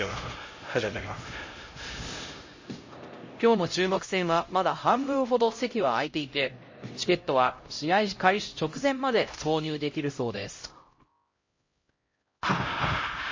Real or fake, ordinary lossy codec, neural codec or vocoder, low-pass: fake; MP3, 32 kbps; codec, 16 kHz, 0.5 kbps, X-Codec, HuBERT features, trained on LibriSpeech; 7.2 kHz